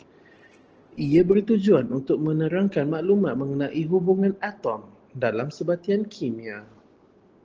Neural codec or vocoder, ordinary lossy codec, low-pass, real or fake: none; Opus, 16 kbps; 7.2 kHz; real